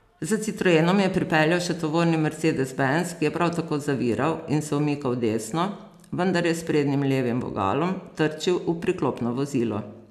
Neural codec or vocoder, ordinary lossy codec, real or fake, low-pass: none; none; real; 14.4 kHz